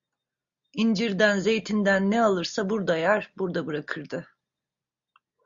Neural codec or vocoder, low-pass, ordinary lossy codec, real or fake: none; 7.2 kHz; Opus, 64 kbps; real